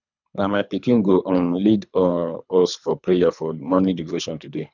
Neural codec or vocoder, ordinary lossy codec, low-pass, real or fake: codec, 24 kHz, 3 kbps, HILCodec; none; 7.2 kHz; fake